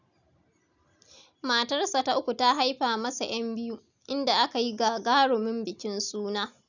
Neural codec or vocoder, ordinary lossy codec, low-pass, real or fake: none; none; 7.2 kHz; real